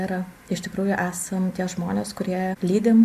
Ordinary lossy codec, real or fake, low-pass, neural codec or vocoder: MP3, 64 kbps; real; 14.4 kHz; none